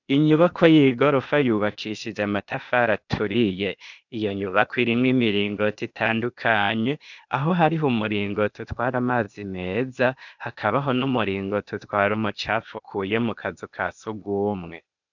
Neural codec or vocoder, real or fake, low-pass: codec, 16 kHz, 0.8 kbps, ZipCodec; fake; 7.2 kHz